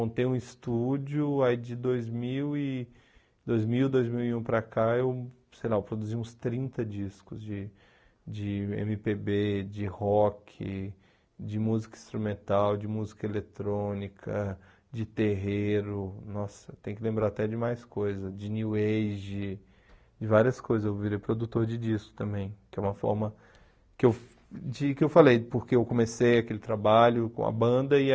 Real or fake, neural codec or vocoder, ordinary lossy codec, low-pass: real; none; none; none